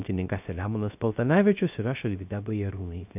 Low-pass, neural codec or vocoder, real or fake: 3.6 kHz; codec, 16 kHz, 0.3 kbps, FocalCodec; fake